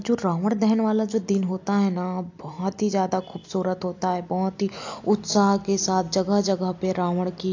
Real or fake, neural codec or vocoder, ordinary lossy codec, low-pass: real; none; AAC, 48 kbps; 7.2 kHz